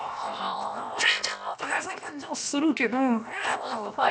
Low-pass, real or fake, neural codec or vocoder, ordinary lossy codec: none; fake; codec, 16 kHz, 0.7 kbps, FocalCodec; none